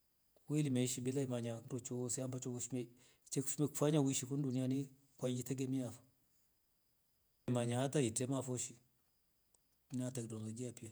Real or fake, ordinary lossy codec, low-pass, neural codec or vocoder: fake; none; none; vocoder, 48 kHz, 128 mel bands, Vocos